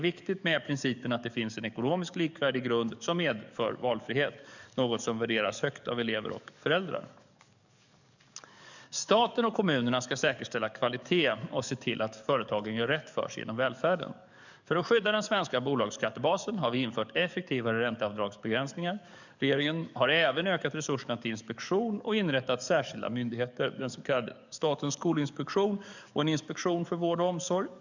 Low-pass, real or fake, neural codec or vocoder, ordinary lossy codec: 7.2 kHz; fake; codec, 44.1 kHz, 7.8 kbps, DAC; none